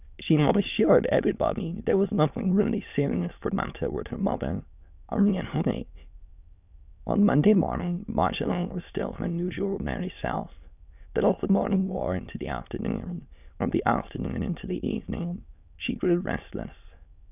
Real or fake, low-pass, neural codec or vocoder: fake; 3.6 kHz; autoencoder, 22.05 kHz, a latent of 192 numbers a frame, VITS, trained on many speakers